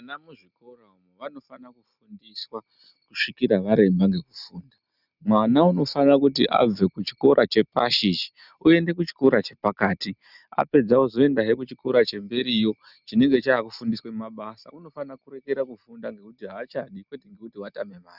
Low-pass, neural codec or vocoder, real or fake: 5.4 kHz; none; real